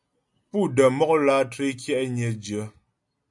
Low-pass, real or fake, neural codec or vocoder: 10.8 kHz; real; none